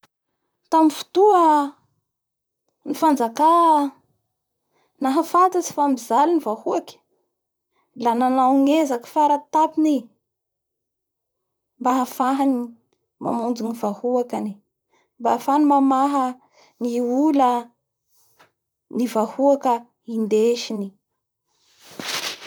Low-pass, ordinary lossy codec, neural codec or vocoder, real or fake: none; none; vocoder, 44.1 kHz, 128 mel bands, Pupu-Vocoder; fake